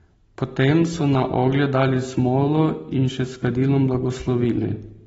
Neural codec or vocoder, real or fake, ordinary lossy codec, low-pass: none; real; AAC, 24 kbps; 14.4 kHz